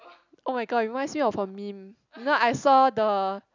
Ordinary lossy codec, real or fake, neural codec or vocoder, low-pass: none; real; none; 7.2 kHz